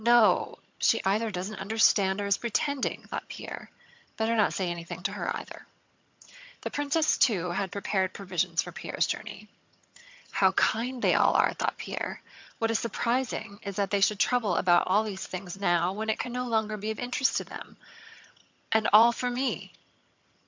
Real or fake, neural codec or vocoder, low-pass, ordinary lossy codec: fake; vocoder, 22.05 kHz, 80 mel bands, HiFi-GAN; 7.2 kHz; MP3, 64 kbps